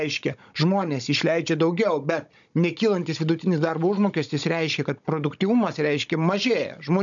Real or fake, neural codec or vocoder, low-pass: fake; codec, 16 kHz, 16 kbps, FunCodec, trained on Chinese and English, 50 frames a second; 7.2 kHz